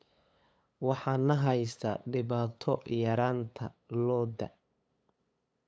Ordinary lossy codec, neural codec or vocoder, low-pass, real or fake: none; codec, 16 kHz, 8 kbps, FunCodec, trained on LibriTTS, 25 frames a second; none; fake